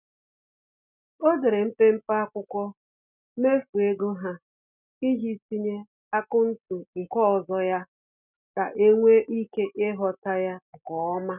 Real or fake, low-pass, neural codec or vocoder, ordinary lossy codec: real; 3.6 kHz; none; none